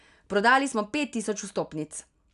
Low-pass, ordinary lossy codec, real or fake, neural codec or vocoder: 10.8 kHz; none; real; none